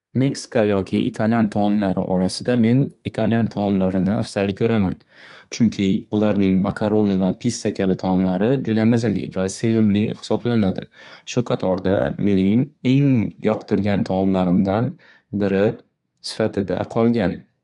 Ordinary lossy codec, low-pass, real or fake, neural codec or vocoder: none; 10.8 kHz; fake; codec, 24 kHz, 1 kbps, SNAC